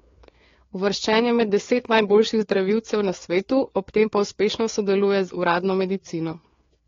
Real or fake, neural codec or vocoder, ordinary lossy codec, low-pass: fake; codec, 16 kHz, 4 kbps, FreqCodec, larger model; AAC, 32 kbps; 7.2 kHz